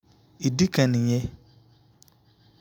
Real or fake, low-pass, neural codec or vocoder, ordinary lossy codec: real; none; none; none